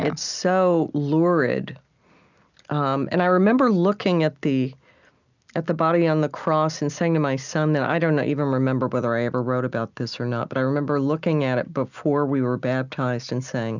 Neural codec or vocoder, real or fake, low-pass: none; real; 7.2 kHz